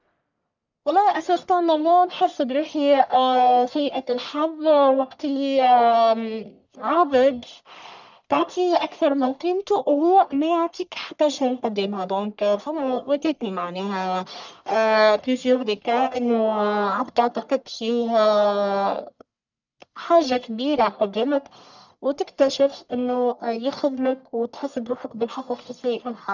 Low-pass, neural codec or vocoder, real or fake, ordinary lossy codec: 7.2 kHz; codec, 44.1 kHz, 1.7 kbps, Pupu-Codec; fake; none